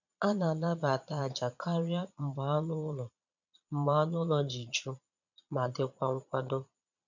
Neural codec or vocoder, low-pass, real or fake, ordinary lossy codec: vocoder, 22.05 kHz, 80 mel bands, Vocos; 7.2 kHz; fake; none